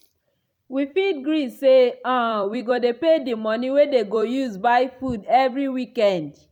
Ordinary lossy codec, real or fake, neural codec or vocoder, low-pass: none; fake; vocoder, 44.1 kHz, 128 mel bands every 256 samples, BigVGAN v2; 19.8 kHz